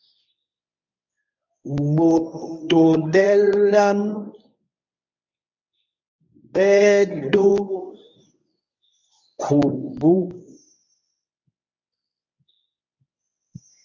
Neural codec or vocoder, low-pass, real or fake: codec, 24 kHz, 0.9 kbps, WavTokenizer, medium speech release version 1; 7.2 kHz; fake